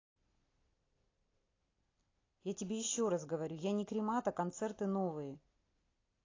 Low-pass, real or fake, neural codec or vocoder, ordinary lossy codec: 7.2 kHz; real; none; AAC, 32 kbps